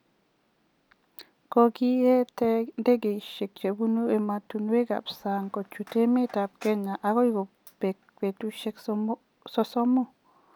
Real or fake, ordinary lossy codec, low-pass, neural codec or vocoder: real; none; 19.8 kHz; none